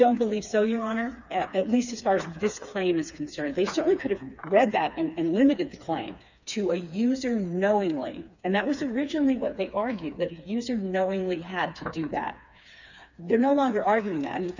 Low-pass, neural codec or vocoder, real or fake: 7.2 kHz; codec, 16 kHz, 4 kbps, FreqCodec, smaller model; fake